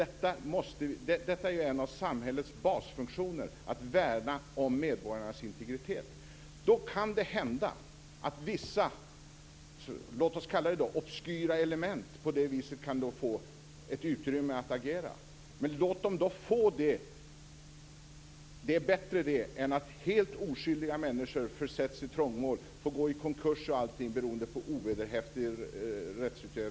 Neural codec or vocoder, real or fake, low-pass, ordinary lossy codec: none; real; none; none